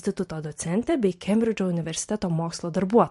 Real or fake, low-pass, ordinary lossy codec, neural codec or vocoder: fake; 14.4 kHz; MP3, 48 kbps; vocoder, 44.1 kHz, 128 mel bands every 512 samples, BigVGAN v2